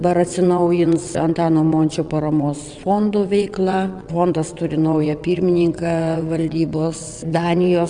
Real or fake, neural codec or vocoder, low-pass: fake; vocoder, 22.05 kHz, 80 mel bands, WaveNeXt; 9.9 kHz